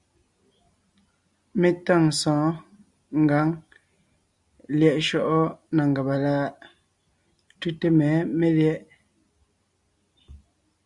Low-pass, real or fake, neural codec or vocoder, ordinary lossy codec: 10.8 kHz; real; none; Opus, 64 kbps